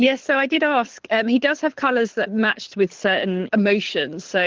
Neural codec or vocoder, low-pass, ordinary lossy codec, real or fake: codec, 24 kHz, 6 kbps, HILCodec; 7.2 kHz; Opus, 16 kbps; fake